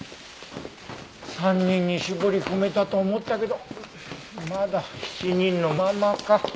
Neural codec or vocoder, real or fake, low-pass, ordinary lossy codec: none; real; none; none